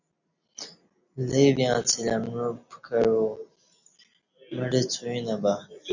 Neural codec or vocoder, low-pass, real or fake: none; 7.2 kHz; real